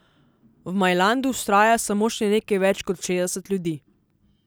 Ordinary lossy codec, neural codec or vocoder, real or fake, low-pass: none; none; real; none